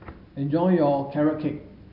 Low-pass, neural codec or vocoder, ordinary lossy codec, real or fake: 5.4 kHz; none; none; real